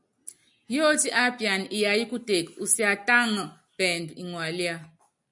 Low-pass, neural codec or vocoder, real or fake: 10.8 kHz; none; real